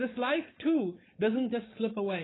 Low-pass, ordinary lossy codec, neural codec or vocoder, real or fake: 7.2 kHz; AAC, 16 kbps; codec, 16 kHz, 4.8 kbps, FACodec; fake